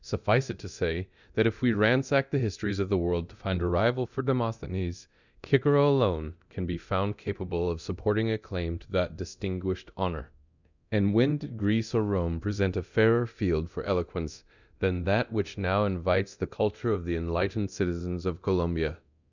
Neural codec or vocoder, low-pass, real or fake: codec, 24 kHz, 0.9 kbps, DualCodec; 7.2 kHz; fake